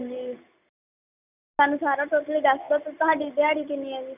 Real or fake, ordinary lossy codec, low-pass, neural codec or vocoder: real; none; 3.6 kHz; none